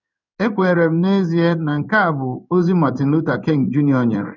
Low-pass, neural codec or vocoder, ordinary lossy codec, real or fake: 7.2 kHz; codec, 16 kHz in and 24 kHz out, 1 kbps, XY-Tokenizer; none; fake